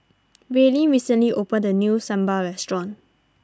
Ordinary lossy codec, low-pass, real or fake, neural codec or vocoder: none; none; real; none